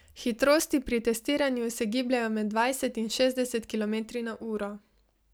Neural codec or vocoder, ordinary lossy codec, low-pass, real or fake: none; none; none; real